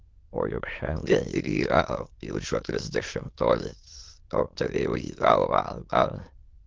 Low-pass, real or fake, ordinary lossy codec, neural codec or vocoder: 7.2 kHz; fake; Opus, 16 kbps; autoencoder, 22.05 kHz, a latent of 192 numbers a frame, VITS, trained on many speakers